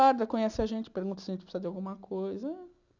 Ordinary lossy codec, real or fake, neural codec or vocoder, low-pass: none; real; none; 7.2 kHz